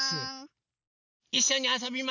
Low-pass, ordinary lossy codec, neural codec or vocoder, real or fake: 7.2 kHz; none; none; real